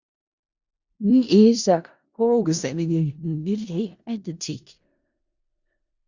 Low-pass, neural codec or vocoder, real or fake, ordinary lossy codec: 7.2 kHz; codec, 16 kHz in and 24 kHz out, 0.4 kbps, LongCat-Audio-Codec, four codebook decoder; fake; Opus, 64 kbps